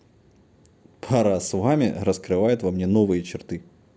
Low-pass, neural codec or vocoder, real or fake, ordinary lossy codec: none; none; real; none